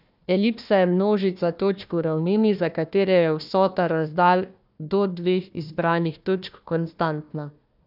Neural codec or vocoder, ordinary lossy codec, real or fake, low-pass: codec, 16 kHz, 1 kbps, FunCodec, trained on Chinese and English, 50 frames a second; none; fake; 5.4 kHz